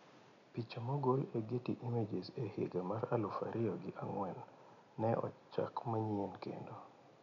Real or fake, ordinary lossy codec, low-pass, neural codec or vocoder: real; none; 7.2 kHz; none